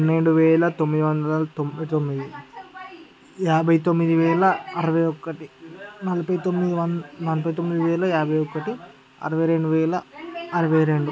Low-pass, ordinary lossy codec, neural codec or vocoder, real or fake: none; none; none; real